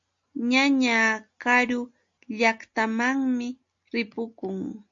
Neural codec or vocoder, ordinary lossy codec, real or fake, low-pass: none; AAC, 64 kbps; real; 7.2 kHz